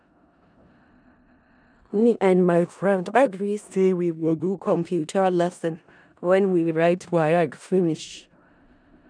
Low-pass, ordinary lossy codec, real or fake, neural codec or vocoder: 9.9 kHz; none; fake; codec, 16 kHz in and 24 kHz out, 0.4 kbps, LongCat-Audio-Codec, four codebook decoder